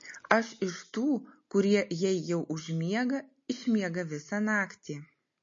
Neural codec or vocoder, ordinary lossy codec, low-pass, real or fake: none; MP3, 32 kbps; 7.2 kHz; real